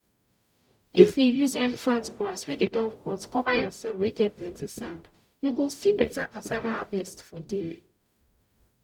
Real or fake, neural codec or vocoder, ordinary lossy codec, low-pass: fake; codec, 44.1 kHz, 0.9 kbps, DAC; none; 19.8 kHz